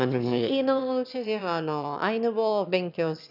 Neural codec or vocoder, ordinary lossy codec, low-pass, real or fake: autoencoder, 22.05 kHz, a latent of 192 numbers a frame, VITS, trained on one speaker; none; 5.4 kHz; fake